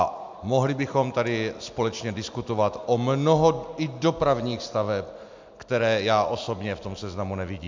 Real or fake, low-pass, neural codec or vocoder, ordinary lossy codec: real; 7.2 kHz; none; MP3, 64 kbps